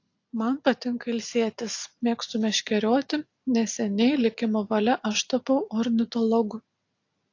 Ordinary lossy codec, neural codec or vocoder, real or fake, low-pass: AAC, 48 kbps; none; real; 7.2 kHz